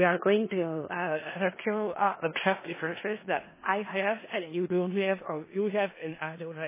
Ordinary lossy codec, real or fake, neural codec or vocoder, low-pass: MP3, 16 kbps; fake; codec, 16 kHz in and 24 kHz out, 0.4 kbps, LongCat-Audio-Codec, four codebook decoder; 3.6 kHz